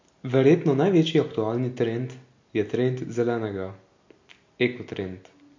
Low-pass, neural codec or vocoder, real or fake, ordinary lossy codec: 7.2 kHz; none; real; MP3, 48 kbps